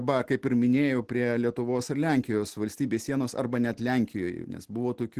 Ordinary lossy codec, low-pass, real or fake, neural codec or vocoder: Opus, 16 kbps; 14.4 kHz; real; none